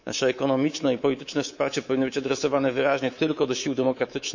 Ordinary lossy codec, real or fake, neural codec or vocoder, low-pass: none; fake; codec, 24 kHz, 3.1 kbps, DualCodec; 7.2 kHz